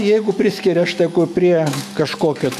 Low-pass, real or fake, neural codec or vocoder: 14.4 kHz; fake; autoencoder, 48 kHz, 128 numbers a frame, DAC-VAE, trained on Japanese speech